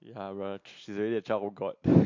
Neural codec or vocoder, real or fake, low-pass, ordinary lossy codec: none; real; 7.2 kHz; MP3, 48 kbps